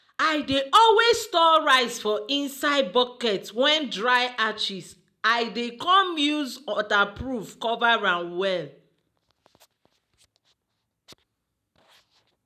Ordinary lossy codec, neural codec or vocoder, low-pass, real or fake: none; none; 14.4 kHz; real